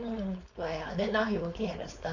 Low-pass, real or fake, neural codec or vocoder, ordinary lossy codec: 7.2 kHz; fake; codec, 16 kHz, 4.8 kbps, FACodec; AAC, 48 kbps